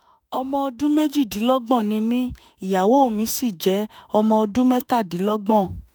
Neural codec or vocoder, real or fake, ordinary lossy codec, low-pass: autoencoder, 48 kHz, 32 numbers a frame, DAC-VAE, trained on Japanese speech; fake; none; none